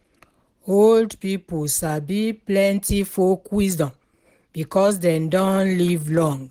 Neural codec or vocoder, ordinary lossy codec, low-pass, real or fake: none; Opus, 16 kbps; 19.8 kHz; real